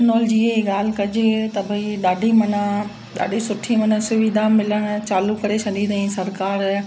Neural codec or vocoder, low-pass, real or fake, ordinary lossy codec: none; none; real; none